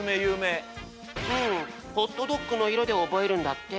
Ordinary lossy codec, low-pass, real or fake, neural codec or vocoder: none; none; real; none